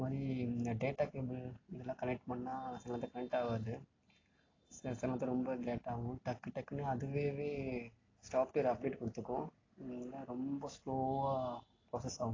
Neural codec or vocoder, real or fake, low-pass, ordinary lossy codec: none; real; 7.2 kHz; AAC, 32 kbps